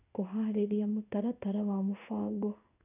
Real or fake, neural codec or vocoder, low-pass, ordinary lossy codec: fake; codec, 16 kHz in and 24 kHz out, 1 kbps, XY-Tokenizer; 3.6 kHz; none